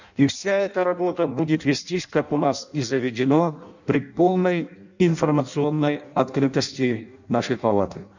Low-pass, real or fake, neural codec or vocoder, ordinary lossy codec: 7.2 kHz; fake; codec, 16 kHz in and 24 kHz out, 0.6 kbps, FireRedTTS-2 codec; none